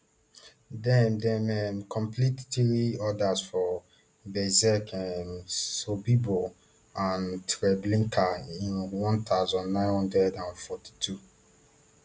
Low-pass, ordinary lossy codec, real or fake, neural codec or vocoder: none; none; real; none